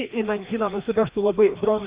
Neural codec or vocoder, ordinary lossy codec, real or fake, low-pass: codec, 16 kHz, 2 kbps, FreqCodec, larger model; Opus, 64 kbps; fake; 3.6 kHz